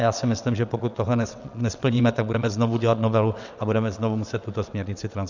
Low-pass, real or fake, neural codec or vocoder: 7.2 kHz; fake; vocoder, 22.05 kHz, 80 mel bands, WaveNeXt